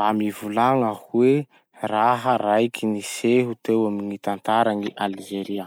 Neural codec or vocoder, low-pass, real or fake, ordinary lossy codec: none; none; real; none